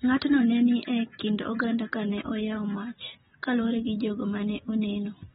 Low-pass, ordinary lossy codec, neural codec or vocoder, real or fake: 19.8 kHz; AAC, 16 kbps; none; real